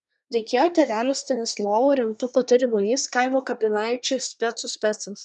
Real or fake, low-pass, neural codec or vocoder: fake; 10.8 kHz; codec, 24 kHz, 1 kbps, SNAC